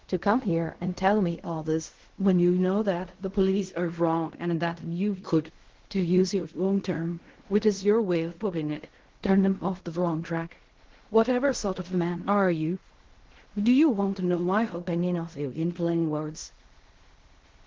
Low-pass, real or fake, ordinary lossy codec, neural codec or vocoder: 7.2 kHz; fake; Opus, 16 kbps; codec, 16 kHz in and 24 kHz out, 0.4 kbps, LongCat-Audio-Codec, fine tuned four codebook decoder